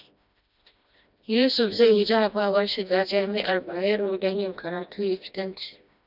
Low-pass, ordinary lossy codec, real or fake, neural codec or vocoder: 5.4 kHz; none; fake; codec, 16 kHz, 1 kbps, FreqCodec, smaller model